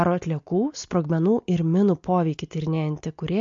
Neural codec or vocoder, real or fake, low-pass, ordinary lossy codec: none; real; 7.2 kHz; MP3, 48 kbps